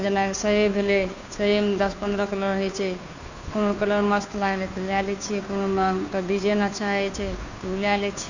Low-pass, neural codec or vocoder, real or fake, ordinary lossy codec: 7.2 kHz; codec, 16 kHz, 2 kbps, FunCodec, trained on Chinese and English, 25 frames a second; fake; none